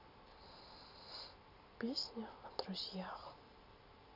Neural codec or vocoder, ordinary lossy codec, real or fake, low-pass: none; none; real; 5.4 kHz